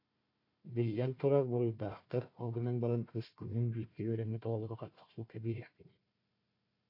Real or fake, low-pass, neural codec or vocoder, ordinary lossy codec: fake; 5.4 kHz; codec, 16 kHz, 1 kbps, FunCodec, trained on Chinese and English, 50 frames a second; AAC, 32 kbps